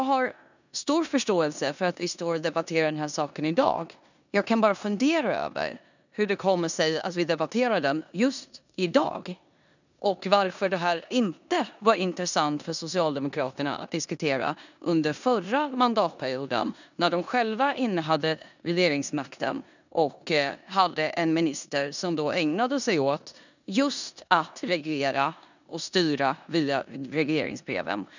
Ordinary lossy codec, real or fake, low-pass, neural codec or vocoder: none; fake; 7.2 kHz; codec, 16 kHz in and 24 kHz out, 0.9 kbps, LongCat-Audio-Codec, four codebook decoder